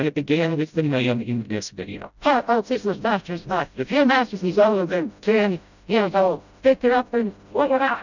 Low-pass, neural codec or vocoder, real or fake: 7.2 kHz; codec, 16 kHz, 0.5 kbps, FreqCodec, smaller model; fake